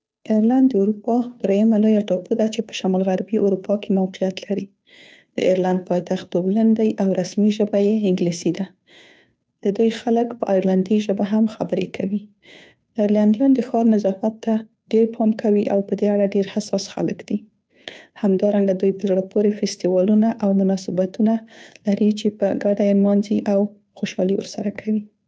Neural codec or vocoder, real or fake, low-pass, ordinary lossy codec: codec, 16 kHz, 2 kbps, FunCodec, trained on Chinese and English, 25 frames a second; fake; none; none